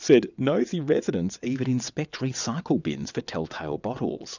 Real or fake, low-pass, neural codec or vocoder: fake; 7.2 kHz; vocoder, 22.05 kHz, 80 mel bands, WaveNeXt